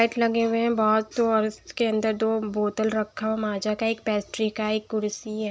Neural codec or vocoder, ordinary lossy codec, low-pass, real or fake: none; none; none; real